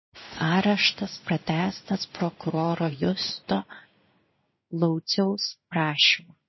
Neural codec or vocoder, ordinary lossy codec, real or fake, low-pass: codec, 16 kHz in and 24 kHz out, 1 kbps, XY-Tokenizer; MP3, 24 kbps; fake; 7.2 kHz